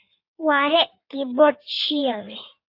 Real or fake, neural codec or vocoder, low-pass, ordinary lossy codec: fake; codec, 16 kHz in and 24 kHz out, 1.1 kbps, FireRedTTS-2 codec; 5.4 kHz; AAC, 32 kbps